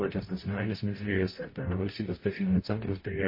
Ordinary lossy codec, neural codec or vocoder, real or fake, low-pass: MP3, 24 kbps; codec, 44.1 kHz, 0.9 kbps, DAC; fake; 5.4 kHz